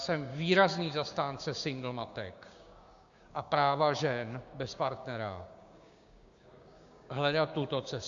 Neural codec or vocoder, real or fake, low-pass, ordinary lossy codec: codec, 16 kHz, 6 kbps, DAC; fake; 7.2 kHz; Opus, 64 kbps